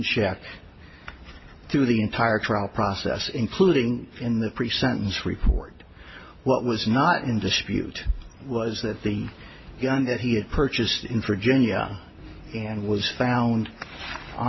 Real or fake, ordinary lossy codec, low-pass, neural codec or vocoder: real; MP3, 24 kbps; 7.2 kHz; none